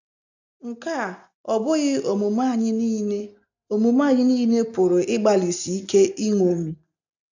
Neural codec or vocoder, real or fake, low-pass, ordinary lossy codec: none; real; 7.2 kHz; none